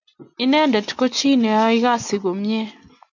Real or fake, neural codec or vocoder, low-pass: real; none; 7.2 kHz